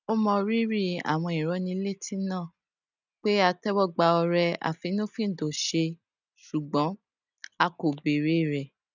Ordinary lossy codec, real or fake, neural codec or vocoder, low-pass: none; real; none; 7.2 kHz